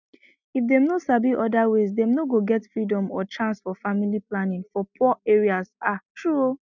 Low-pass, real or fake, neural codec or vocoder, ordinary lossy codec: 7.2 kHz; real; none; none